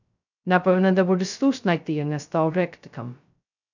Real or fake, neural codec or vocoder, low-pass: fake; codec, 16 kHz, 0.2 kbps, FocalCodec; 7.2 kHz